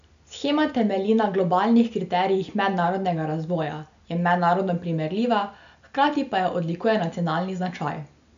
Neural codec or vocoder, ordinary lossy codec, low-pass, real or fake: none; none; 7.2 kHz; real